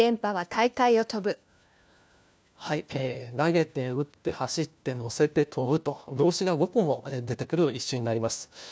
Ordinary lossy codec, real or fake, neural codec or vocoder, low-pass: none; fake; codec, 16 kHz, 1 kbps, FunCodec, trained on LibriTTS, 50 frames a second; none